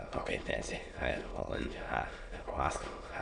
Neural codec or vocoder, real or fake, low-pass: autoencoder, 22.05 kHz, a latent of 192 numbers a frame, VITS, trained on many speakers; fake; 9.9 kHz